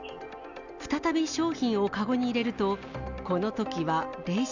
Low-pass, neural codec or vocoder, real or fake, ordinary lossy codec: 7.2 kHz; none; real; none